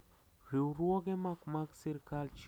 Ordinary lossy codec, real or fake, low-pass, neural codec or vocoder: none; real; none; none